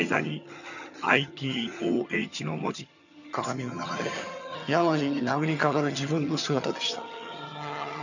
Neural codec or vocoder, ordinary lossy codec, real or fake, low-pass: vocoder, 22.05 kHz, 80 mel bands, HiFi-GAN; none; fake; 7.2 kHz